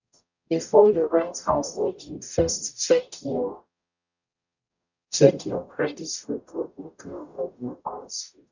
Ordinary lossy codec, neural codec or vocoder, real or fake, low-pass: none; codec, 44.1 kHz, 0.9 kbps, DAC; fake; 7.2 kHz